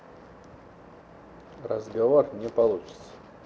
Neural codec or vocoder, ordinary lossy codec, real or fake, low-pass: none; none; real; none